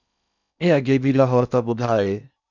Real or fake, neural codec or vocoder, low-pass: fake; codec, 16 kHz in and 24 kHz out, 0.6 kbps, FocalCodec, streaming, 4096 codes; 7.2 kHz